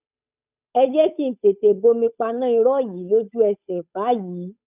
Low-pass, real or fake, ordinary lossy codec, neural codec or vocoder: 3.6 kHz; fake; none; codec, 16 kHz, 8 kbps, FunCodec, trained on Chinese and English, 25 frames a second